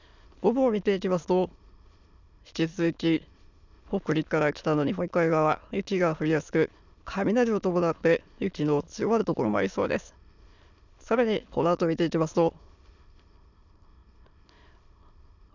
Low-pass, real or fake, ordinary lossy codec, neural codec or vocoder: 7.2 kHz; fake; none; autoencoder, 22.05 kHz, a latent of 192 numbers a frame, VITS, trained on many speakers